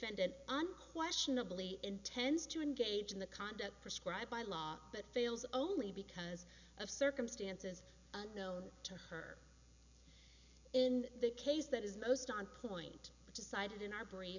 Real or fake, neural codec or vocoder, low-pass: real; none; 7.2 kHz